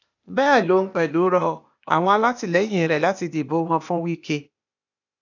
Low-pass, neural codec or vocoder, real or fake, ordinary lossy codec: 7.2 kHz; codec, 16 kHz, 0.8 kbps, ZipCodec; fake; none